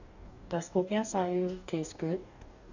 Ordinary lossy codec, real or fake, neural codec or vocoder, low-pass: none; fake; codec, 44.1 kHz, 2.6 kbps, DAC; 7.2 kHz